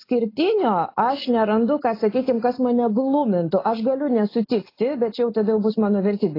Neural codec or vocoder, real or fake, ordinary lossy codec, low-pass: none; real; AAC, 24 kbps; 5.4 kHz